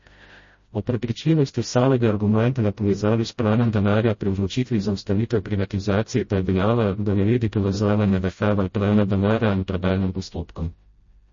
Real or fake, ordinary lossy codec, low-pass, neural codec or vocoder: fake; MP3, 32 kbps; 7.2 kHz; codec, 16 kHz, 0.5 kbps, FreqCodec, smaller model